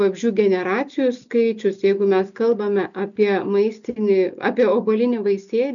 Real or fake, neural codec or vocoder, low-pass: real; none; 7.2 kHz